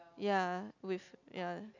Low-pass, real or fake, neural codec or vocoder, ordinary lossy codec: 7.2 kHz; real; none; none